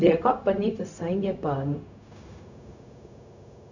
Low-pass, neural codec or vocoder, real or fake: 7.2 kHz; codec, 16 kHz, 0.4 kbps, LongCat-Audio-Codec; fake